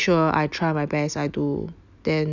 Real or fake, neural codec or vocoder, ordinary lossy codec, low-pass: real; none; none; 7.2 kHz